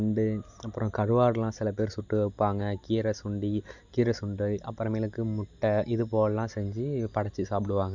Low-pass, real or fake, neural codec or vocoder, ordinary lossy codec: 7.2 kHz; real; none; none